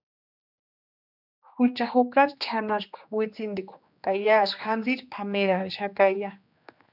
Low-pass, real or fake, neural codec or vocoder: 5.4 kHz; fake; codec, 16 kHz, 2 kbps, X-Codec, HuBERT features, trained on general audio